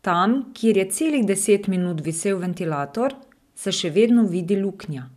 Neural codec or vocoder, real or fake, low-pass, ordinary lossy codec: none; real; 14.4 kHz; none